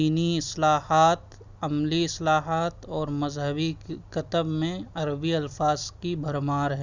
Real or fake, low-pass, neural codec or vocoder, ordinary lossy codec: real; 7.2 kHz; none; Opus, 64 kbps